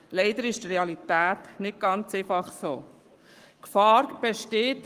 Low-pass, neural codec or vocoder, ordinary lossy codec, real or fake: 14.4 kHz; codec, 44.1 kHz, 7.8 kbps, Pupu-Codec; Opus, 32 kbps; fake